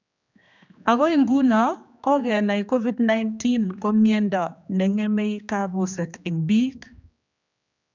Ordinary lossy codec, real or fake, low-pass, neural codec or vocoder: none; fake; 7.2 kHz; codec, 16 kHz, 2 kbps, X-Codec, HuBERT features, trained on general audio